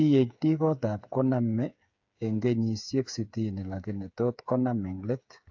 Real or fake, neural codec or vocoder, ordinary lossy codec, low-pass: fake; codec, 16 kHz, 8 kbps, FreqCodec, smaller model; AAC, 48 kbps; 7.2 kHz